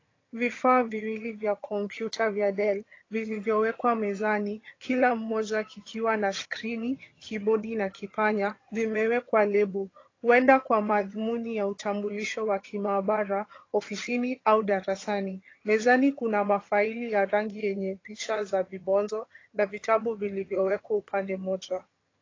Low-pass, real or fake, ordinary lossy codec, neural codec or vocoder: 7.2 kHz; fake; AAC, 32 kbps; vocoder, 22.05 kHz, 80 mel bands, HiFi-GAN